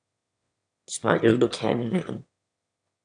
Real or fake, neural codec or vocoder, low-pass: fake; autoencoder, 22.05 kHz, a latent of 192 numbers a frame, VITS, trained on one speaker; 9.9 kHz